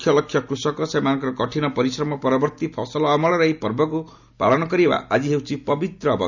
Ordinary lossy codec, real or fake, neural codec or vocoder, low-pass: none; real; none; 7.2 kHz